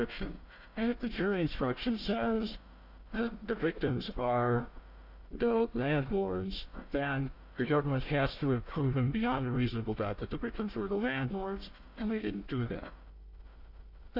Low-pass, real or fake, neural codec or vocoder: 5.4 kHz; fake; codec, 16 kHz, 1 kbps, FunCodec, trained on Chinese and English, 50 frames a second